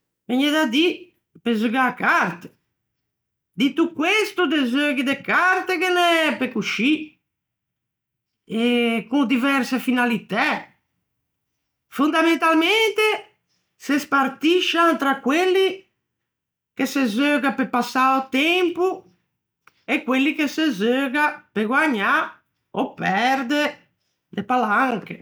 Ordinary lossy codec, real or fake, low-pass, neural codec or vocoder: none; real; none; none